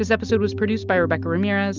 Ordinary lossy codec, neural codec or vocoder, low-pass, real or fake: Opus, 24 kbps; none; 7.2 kHz; real